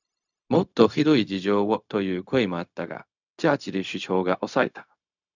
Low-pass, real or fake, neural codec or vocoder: 7.2 kHz; fake; codec, 16 kHz, 0.4 kbps, LongCat-Audio-Codec